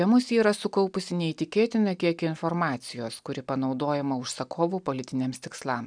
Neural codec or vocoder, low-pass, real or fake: none; 9.9 kHz; real